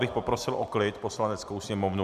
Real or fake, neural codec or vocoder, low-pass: real; none; 14.4 kHz